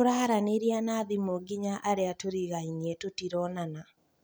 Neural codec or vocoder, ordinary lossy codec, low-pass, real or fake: none; none; none; real